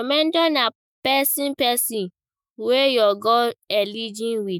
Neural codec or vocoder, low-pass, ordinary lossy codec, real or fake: autoencoder, 48 kHz, 128 numbers a frame, DAC-VAE, trained on Japanese speech; none; none; fake